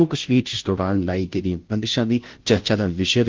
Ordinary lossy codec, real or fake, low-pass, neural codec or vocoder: Opus, 16 kbps; fake; 7.2 kHz; codec, 16 kHz, 0.5 kbps, FunCodec, trained on Chinese and English, 25 frames a second